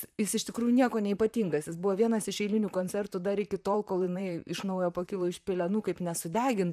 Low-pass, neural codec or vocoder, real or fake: 14.4 kHz; vocoder, 44.1 kHz, 128 mel bands, Pupu-Vocoder; fake